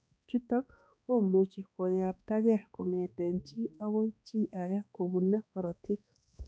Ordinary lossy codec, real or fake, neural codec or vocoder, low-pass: none; fake; codec, 16 kHz, 2 kbps, X-Codec, HuBERT features, trained on balanced general audio; none